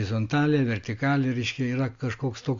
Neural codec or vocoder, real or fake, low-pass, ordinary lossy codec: none; real; 7.2 kHz; AAC, 48 kbps